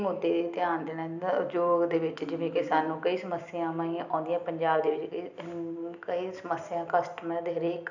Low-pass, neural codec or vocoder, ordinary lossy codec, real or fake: 7.2 kHz; none; none; real